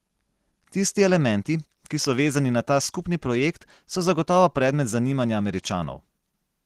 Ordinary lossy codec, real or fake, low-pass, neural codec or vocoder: Opus, 16 kbps; real; 14.4 kHz; none